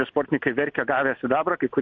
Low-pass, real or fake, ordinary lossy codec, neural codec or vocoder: 7.2 kHz; real; MP3, 48 kbps; none